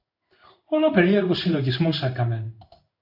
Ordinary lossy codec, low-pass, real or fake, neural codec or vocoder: AAC, 48 kbps; 5.4 kHz; fake; codec, 16 kHz in and 24 kHz out, 1 kbps, XY-Tokenizer